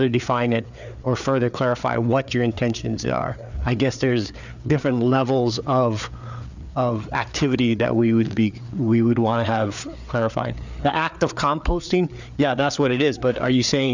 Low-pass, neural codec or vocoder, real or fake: 7.2 kHz; codec, 16 kHz, 4 kbps, FreqCodec, larger model; fake